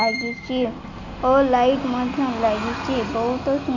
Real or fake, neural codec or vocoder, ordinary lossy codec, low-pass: real; none; none; 7.2 kHz